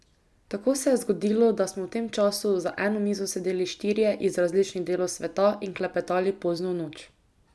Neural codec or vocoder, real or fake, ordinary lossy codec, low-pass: none; real; none; none